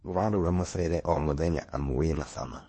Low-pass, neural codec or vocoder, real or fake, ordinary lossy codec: 10.8 kHz; codec, 16 kHz in and 24 kHz out, 0.8 kbps, FocalCodec, streaming, 65536 codes; fake; MP3, 32 kbps